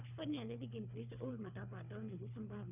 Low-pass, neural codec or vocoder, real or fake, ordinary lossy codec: 3.6 kHz; codec, 24 kHz, 3 kbps, HILCodec; fake; none